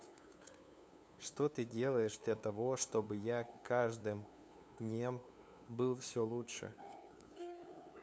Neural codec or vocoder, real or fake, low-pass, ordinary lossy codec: codec, 16 kHz, 2 kbps, FunCodec, trained on LibriTTS, 25 frames a second; fake; none; none